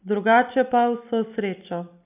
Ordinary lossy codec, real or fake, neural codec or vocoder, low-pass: none; real; none; 3.6 kHz